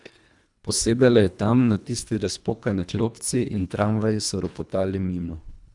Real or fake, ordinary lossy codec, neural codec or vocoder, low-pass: fake; none; codec, 24 kHz, 1.5 kbps, HILCodec; 10.8 kHz